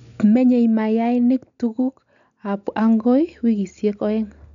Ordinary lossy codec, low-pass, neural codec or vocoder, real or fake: none; 7.2 kHz; none; real